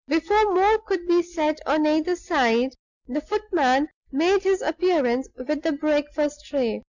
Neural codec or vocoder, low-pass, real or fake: none; 7.2 kHz; real